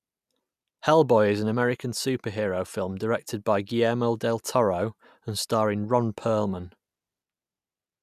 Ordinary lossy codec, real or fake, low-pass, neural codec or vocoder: none; real; 14.4 kHz; none